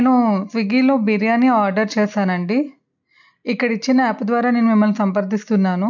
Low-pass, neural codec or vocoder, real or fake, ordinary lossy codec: 7.2 kHz; none; real; none